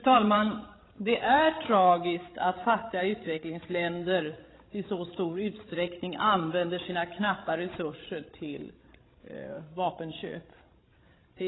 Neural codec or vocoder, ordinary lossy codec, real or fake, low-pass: codec, 16 kHz, 16 kbps, FreqCodec, larger model; AAC, 16 kbps; fake; 7.2 kHz